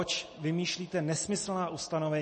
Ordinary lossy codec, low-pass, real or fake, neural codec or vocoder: MP3, 32 kbps; 10.8 kHz; real; none